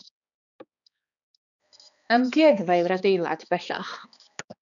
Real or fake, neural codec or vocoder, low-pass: fake; codec, 16 kHz, 2 kbps, X-Codec, HuBERT features, trained on balanced general audio; 7.2 kHz